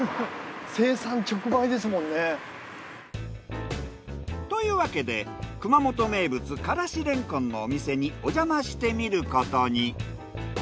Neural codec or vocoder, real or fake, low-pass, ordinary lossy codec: none; real; none; none